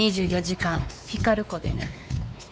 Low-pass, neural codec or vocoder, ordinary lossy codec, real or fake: none; codec, 16 kHz, 4 kbps, X-Codec, WavLM features, trained on Multilingual LibriSpeech; none; fake